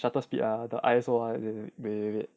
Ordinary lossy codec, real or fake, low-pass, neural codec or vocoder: none; real; none; none